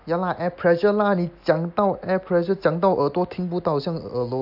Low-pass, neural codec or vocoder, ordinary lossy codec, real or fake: 5.4 kHz; none; AAC, 48 kbps; real